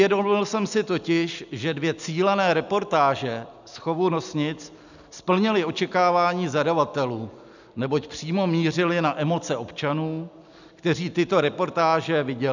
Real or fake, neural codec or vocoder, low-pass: real; none; 7.2 kHz